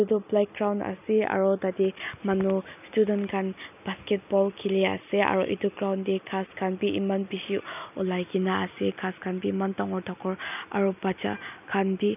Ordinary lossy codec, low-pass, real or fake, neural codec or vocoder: none; 3.6 kHz; real; none